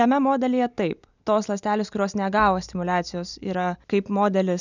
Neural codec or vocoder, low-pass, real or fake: none; 7.2 kHz; real